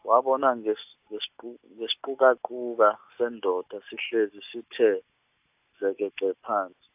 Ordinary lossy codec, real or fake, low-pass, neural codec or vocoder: AAC, 32 kbps; real; 3.6 kHz; none